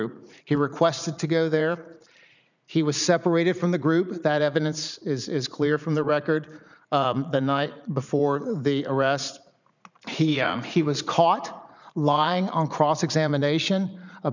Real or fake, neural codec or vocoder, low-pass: fake; vocoder, 44.1 kHz, 80 mel bands, Vocos; 7.2 kHz